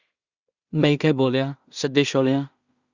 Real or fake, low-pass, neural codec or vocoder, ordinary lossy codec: fake; 7.2 kHz; codec, 16 kHz in and 24 kHz out, 0.4 kbps, LongCat-Audio-Codec, two codebook decoder; Opus, 64 kbps